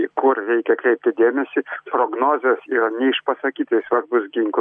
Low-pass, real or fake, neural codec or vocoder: 9.9 kHz; real; none